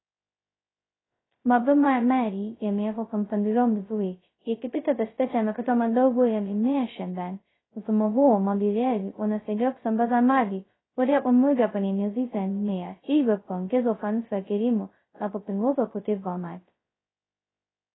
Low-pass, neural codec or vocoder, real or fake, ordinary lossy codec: 7.2 kHz; codec, 16 kHz, 0.2 kbps, FocalCodec; fake; AAC, 16 kbps